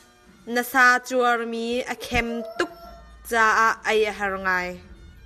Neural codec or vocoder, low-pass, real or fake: none; 14.4 kHz; real